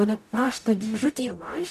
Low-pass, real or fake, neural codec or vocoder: 14.4 kHz; fake; codec, 44.1 kHz, 0.9 kbps, DAC